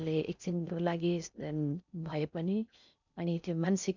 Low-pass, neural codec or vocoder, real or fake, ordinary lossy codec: 7.2 kHz; codec, 16 kHz in and 24 kHz out, 0.6 kbps, FocalCodec, streaming, 4096 codes; fake; none